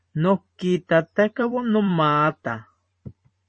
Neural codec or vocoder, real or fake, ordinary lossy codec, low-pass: vocoder, 44.1 kHz, 128 mel bands every 512 samples, BigVGAN v2; fake; MP3, 32 kbps; 9.9 kHz